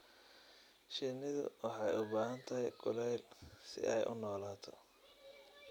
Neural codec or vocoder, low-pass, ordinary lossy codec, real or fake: vocoder, 44.1 kHz, 128 mel bands every 256 samples, BigVGAN v2; 19.8 kHz; none; fake